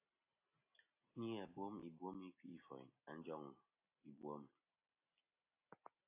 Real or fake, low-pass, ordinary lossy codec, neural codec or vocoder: real; 3.6 kHz; AAC, 32 kbps; none